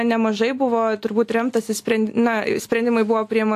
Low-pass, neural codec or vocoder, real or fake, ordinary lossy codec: 14.4 kHz; none; real; AAC, 64 kbps